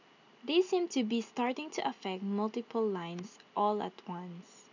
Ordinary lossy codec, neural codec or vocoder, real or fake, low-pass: none; none; real; 7.2 kHz